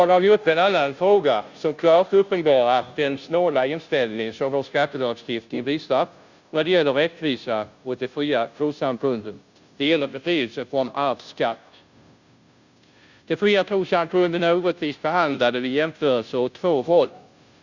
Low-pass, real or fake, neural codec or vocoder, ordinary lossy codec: 7.2 kHz; fake; codec, 16 kHz, 0.5 kbps, FunCodec, trained on Chinese and English, 25 frames a second; Opus, 64 kbps